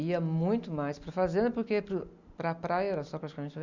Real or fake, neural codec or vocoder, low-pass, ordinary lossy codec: real; none; 7.2 kHz; none